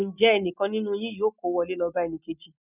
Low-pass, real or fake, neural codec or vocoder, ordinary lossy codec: 3.6 kHz; real; none; none